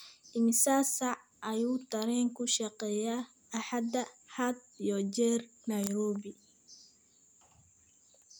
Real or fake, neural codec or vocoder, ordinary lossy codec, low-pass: real; none; none; none